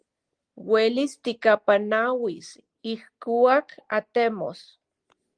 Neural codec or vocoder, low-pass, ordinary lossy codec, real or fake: none; 9.9 kHz; Opus, 24 kbps; real